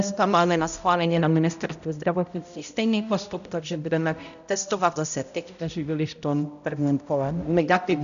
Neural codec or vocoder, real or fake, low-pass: codec, 16 kHz, 0.5 kbps, X-Codec, HuBERT features, trained on balanced general audio; fake; 7.2 kHz